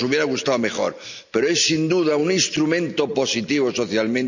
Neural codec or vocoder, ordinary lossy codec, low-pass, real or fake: none; none; 7.2 kHz; real